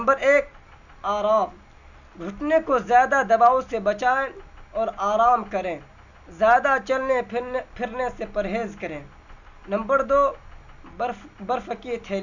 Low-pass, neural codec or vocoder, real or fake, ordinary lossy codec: 7.2 kHz; none; real; none